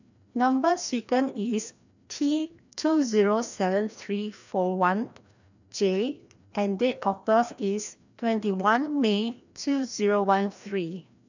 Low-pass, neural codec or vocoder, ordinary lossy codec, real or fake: 7.2 kHz; codec, 16 kHz, 1 kbps, FreqCodec, larger model; none; fake